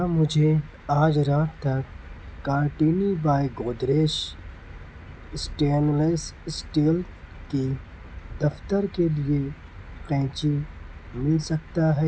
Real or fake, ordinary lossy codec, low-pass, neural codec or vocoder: real; none; none; none